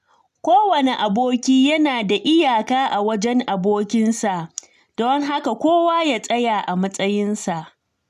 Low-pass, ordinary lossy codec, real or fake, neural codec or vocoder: 14.4 kHz; none; real; none